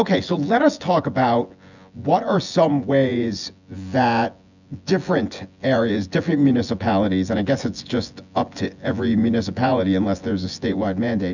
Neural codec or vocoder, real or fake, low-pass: vocoder, 24 kHz, 100 mel bands, Vocos; fake; 7.2 kHz